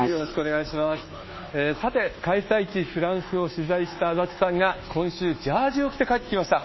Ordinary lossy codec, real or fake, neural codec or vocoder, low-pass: MP3, 24 kbps; fake; codec, 24 kHz, 1.2 kbps, DualCodec; 7.2 kHz